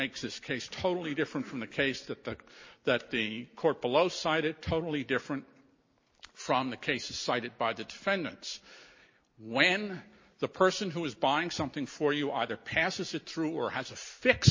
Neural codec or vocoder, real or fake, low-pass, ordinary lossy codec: none; real; 7.2 kHz; MP3, 32 kbps